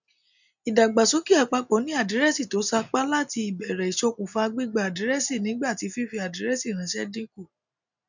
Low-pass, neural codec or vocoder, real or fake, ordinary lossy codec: 7.2 kHz; none; real; none